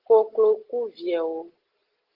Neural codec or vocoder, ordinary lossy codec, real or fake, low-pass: none; Opus, 16 kbps; real; 5.4 kHz